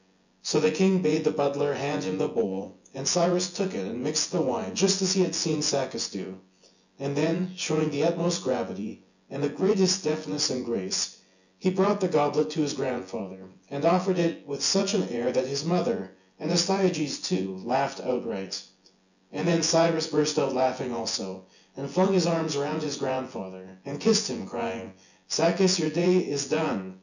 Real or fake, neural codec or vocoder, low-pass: fake; vocoder, 24 kHz, 100 mel bands, Vocos; 7.2 kHz